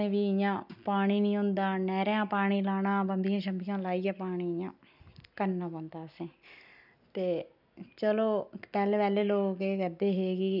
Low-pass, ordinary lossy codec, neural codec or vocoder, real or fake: 5.4 kHz; none; none; real